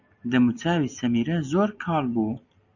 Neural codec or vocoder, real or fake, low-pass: none; real; 7.2 kHz